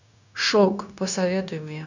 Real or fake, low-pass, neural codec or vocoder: fake; 7.2 kHz; codec, 16 kHz, 0.9 kbps, LongCat-Audio-Codec